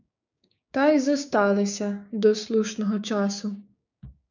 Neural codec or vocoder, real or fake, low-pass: codec, 16 kHz, 6 kbps, DAC; fake; 7.2 kHz